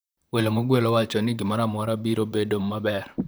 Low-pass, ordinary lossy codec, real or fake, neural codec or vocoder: none; none; fake; vocoder, 44.1 kHz, 128 mel bands, Pupu-Vocoder